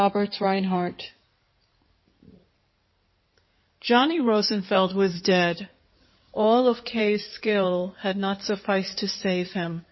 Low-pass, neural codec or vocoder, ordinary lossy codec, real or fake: 7.2 kHz; codec, 16 kHz in and 24 kHz out, 2.2 kbps, FireRedTTS-2 codec; MP3, 24 kbps; fake